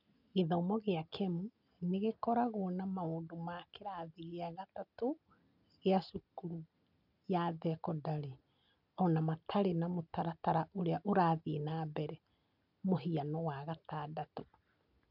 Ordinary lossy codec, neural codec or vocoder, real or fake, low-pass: none; none; real; 5.4 kHz